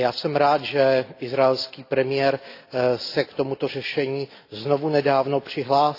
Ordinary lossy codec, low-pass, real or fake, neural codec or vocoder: AAC, 32 kbps; 5.4 kHz; real; none